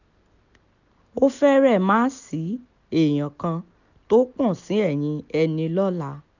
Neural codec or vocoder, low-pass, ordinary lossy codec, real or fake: none; 7.2 kHz; none; real